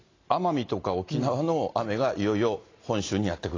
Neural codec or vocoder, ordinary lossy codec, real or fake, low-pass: none; AAC, 32 kbps; real; 7.2 kHz